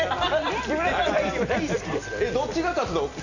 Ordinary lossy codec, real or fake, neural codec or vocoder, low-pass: none; real; none; 7.2 kHz